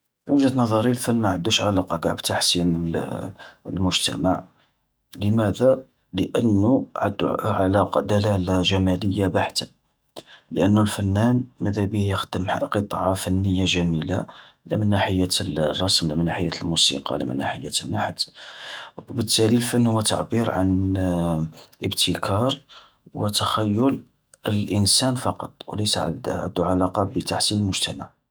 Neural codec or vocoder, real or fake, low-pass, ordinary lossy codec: autoencoder, 48 kHz, 128 numbers a frame, DAC-VAE, trained on Japanese speech; fake; none; none